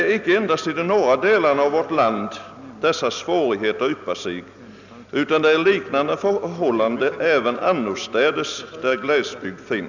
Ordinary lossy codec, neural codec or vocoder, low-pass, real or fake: none; none; 7.2 kHz; real